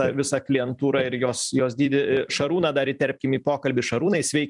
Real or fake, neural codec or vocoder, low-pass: fake; vocoder, 44.1 kHz, 128 mel bands every 256 samples, BigVGAN v2; 10.8 kHz